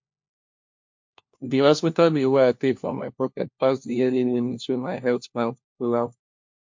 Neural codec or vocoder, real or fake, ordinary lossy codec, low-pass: codec, 16 kHz, 1 kbps, FunCodec, trained on LibriTTS, 50 frames a second; fake; MP3, 48 kbps; 7.2 kHz